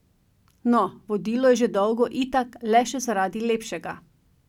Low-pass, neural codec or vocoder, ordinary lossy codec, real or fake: 19.8 kHz; none; none; real